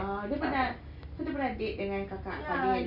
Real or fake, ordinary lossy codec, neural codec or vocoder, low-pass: real; none; none; 5.4 kHz